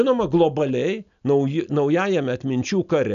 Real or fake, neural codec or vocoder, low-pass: real; none; 7.2 kHz